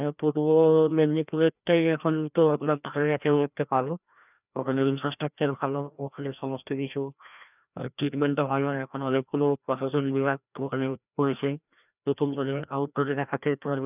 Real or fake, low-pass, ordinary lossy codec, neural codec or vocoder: fake; 3.6 kHz; none; codec, 16 kHz, 1 kbps, FreqCodec, larger model